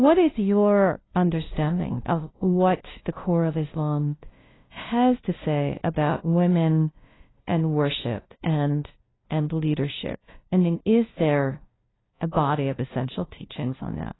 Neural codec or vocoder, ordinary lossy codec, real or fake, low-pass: codec, 16 kHz, 0.5 kbps, FunCodec, trained on LibriTTS, 25 frames a second; AAC, 16 kbps; fake; 7.2 kHz